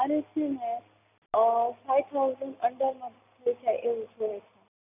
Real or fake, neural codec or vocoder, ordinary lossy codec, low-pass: real; none; none; 3.6 kHz